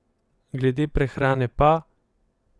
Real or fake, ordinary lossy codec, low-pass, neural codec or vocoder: fake; none; none; vocoder, 22.05 kHz, 80 mel bands, WaveNeXt